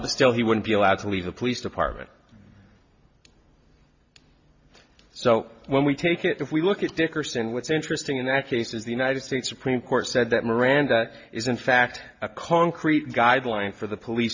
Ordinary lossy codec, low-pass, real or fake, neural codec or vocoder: MP3, 48 kbps; 7.2 kHz; real; none